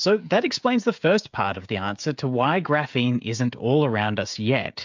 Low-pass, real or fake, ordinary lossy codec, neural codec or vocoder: 7.2 kHz; fake; MP3, 64 kbps; codec, 16 kHz, 16 kbps, FreqCodec, smaller model